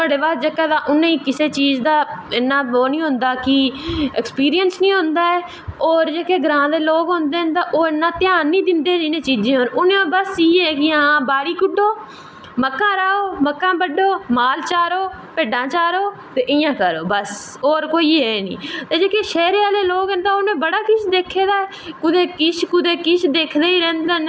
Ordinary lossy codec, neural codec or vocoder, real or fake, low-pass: none; none; real; none